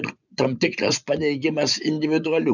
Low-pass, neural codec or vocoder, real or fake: 7.2 kHz; vocoder, 24 kHz, 100 mel bands, Vocos; fake